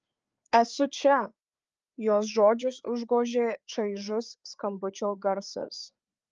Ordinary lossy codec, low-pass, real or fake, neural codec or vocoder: Opus, 24 kbps; 7.2 kHz; fake; codec, 16 kHz, 4 kbps, FreqCodec, larger model